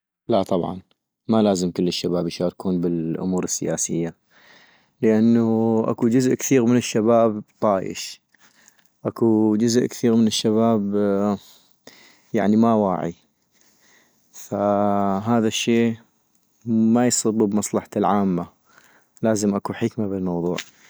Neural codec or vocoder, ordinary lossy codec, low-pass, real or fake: none; none; none; real